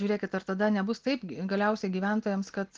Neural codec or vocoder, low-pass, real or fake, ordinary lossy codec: none; 7.2 kHz; real; Opus, 16 kbps